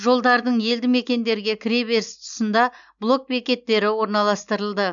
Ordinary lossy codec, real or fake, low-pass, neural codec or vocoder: none; real; 7.2 kHz; none